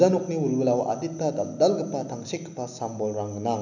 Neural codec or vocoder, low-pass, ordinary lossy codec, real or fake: none; 7.2 kHz; none; real